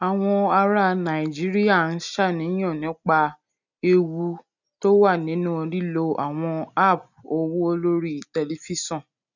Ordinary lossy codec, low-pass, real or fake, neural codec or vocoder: none; 7.2 kHz; real; none